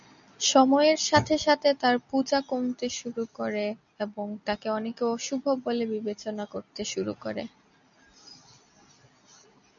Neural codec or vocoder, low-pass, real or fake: none; 7.2 kHz; real